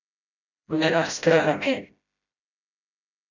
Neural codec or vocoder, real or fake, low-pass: codec, 16 kHz, 0.5 kbps, FreqCodec, smaller model; fake; 7.2 kHz